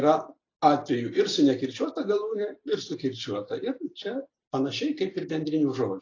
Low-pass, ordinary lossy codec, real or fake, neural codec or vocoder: 7.2 kHz; AAC, 32 kbps; real; none